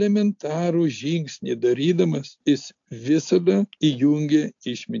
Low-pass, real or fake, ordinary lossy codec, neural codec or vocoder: 7.2 kHz; real; AAC, 64 kbps; none